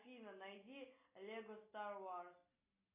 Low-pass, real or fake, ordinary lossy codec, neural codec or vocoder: 3.6 kHz; real; AAC, 32 kbps; none